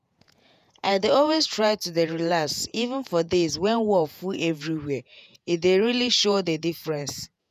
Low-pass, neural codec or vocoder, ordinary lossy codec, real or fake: 14.4 kHz; vocoder, 48 kHz, 128 mel bands, Vocos; none; fake